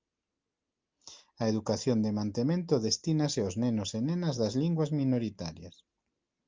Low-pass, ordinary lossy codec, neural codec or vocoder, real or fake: 7.2 kHz; Opus, 32 kbps; none; real